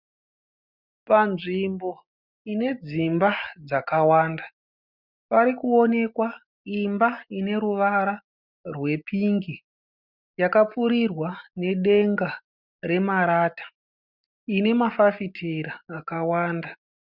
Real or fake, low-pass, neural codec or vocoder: real; 5.4 kHz; none